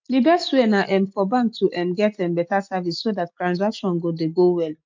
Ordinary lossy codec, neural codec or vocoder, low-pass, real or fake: MP3, 64 kbps; none; 7.2 kHz; real